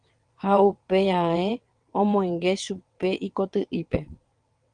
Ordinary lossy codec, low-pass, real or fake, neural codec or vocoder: Opus, 24 kbps; 9.9 kHz; fake; vocoder, 22.05 kHz, 80 mel bands, WaveNeXt